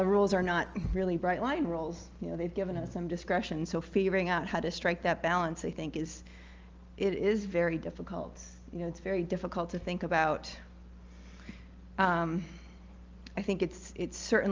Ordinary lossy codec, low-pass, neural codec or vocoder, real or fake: Opus, 24 kbps; 7.2 kHz; none; real